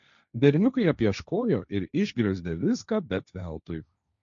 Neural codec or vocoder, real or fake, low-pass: codec, 16 kHz, 1.1 kbps, Voila-Tokenizer; fake; 7.2 kHz